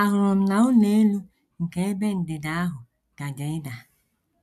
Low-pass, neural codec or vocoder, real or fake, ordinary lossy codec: 14.4 kHz; none; real; none